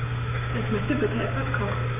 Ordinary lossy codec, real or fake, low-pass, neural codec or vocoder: none; fake; 3.6 kHz; codec, 16 kHz, 6 kbps, DAC